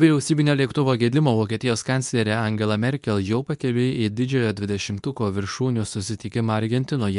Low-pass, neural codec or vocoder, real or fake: 10.8 kHz; codec, 24 kHz, 0.9 kbps, WavTokenizer, medium speech release version 2; fake